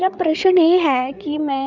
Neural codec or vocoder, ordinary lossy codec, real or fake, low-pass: codec, 16 kHz, 8 kbps, FreqCodec, larger model; none; fake; 7.2 kHz